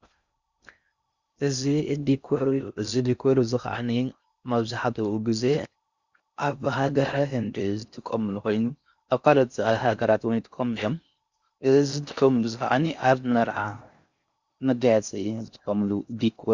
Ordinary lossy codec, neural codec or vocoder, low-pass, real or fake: Opus, 64 kbps; codec, 16 kHz in and 24 kHz out, 0.6 kbps, FocalCodec, streaming, 4096 codes; 7.2 kHz; fake